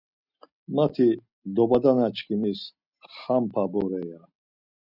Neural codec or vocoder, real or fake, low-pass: none; real; 5.4 kHz